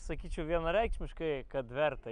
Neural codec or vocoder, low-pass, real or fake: none; 9.9 kHz; real